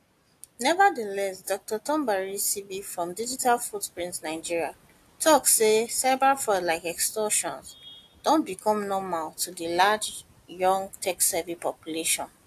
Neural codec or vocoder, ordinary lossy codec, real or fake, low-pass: none; AAC, 64 kbps; real; 14.4 kHz